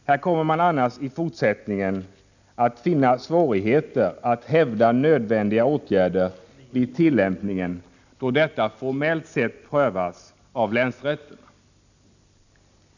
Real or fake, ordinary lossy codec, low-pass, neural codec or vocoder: real; none; 7.2 kHz; none